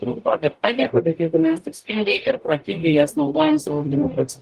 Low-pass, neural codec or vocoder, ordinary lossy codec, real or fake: 14.4 kHz; codec, 44.1 kHz, 0.9 kbps, DAC; Opus, 24 kbps; fake